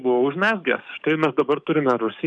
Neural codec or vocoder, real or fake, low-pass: codec, 44.1 kHz, 7.8 kbps, DAC; fake; 9.9 kHz